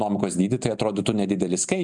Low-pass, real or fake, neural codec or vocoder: 10.8 kHz; real; none